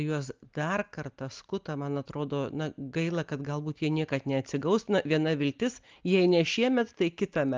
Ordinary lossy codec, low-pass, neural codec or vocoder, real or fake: Opus, 24 kbps; 7.2 kHz; none; real